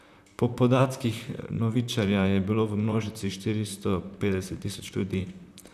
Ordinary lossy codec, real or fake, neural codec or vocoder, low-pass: none; fake; vocoder, 44.1 kHz, 128 mel bands, Pupu-Vocoder; 14.4 kHz